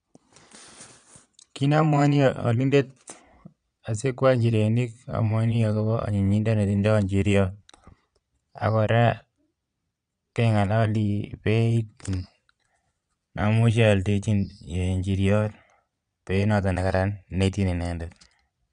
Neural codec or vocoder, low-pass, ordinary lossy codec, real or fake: vocoder, 22.05 kHz, 80 mel bands, Vocos; 9.9 kHz; none; fake